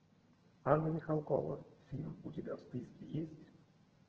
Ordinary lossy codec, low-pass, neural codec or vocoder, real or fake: Opus, 16 kbps; 7.2 kHz; vocoder, 22.05 kHz, 80 mel bands, HiFi-GAN; fake